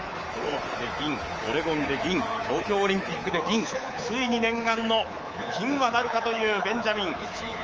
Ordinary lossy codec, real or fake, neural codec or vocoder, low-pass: Opus, 24 kbps; fake; codec, 24 kHz, 3.1 kbps, DualCodec; 7.2 kHz